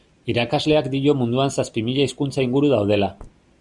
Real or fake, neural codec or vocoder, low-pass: real; none; 10.8 kHz